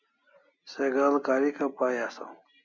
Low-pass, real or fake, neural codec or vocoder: 7.2 kHz; real; none